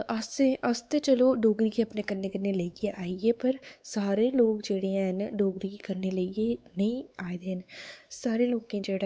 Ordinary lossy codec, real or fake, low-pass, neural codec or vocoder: none; fake; none; codec, 16 kHz, 4 kbps, X-Codec, WavLM features, trained on Multilingual LibriSpeech